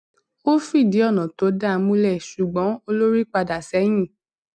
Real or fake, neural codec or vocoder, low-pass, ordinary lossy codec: real; none; 9.9 kHz; none